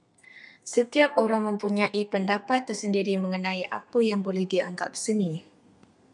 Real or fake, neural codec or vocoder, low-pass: fake; codec, 32 kHz, 1.9 kbps, SNAC; 10.8 kHz